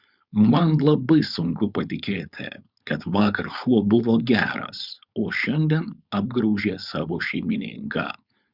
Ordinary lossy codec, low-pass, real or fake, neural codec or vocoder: Opus, 64 kbps; 5.4 kHz; fake; codec, 16 kHz, 4.8 kbps, FACodec